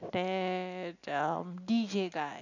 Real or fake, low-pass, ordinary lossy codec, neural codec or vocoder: real; 7.2 kHz; none; none